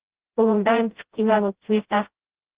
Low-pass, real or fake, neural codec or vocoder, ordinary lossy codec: 3.6 kHz; fake; codec, 16 kHz, 0.5 kbps, FreqCodec, smaller model; Opus, 16 kbps